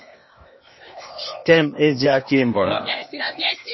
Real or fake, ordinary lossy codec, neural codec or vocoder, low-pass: fake; MP3, 24 kbps; codec, 16 kHz, 0.8 kbps, ZipCodec; 7.2 kHz